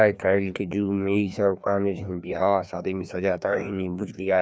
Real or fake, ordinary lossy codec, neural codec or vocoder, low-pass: fake; none; codec, 16 kHz, 2 kbps, FreqCodec, larger model; none